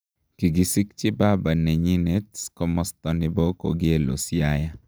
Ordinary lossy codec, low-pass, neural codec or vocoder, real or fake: none; none; none; real